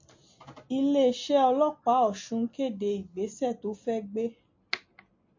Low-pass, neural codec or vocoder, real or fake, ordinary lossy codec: 7.2 kHz; none; real; MP3, 48 kbps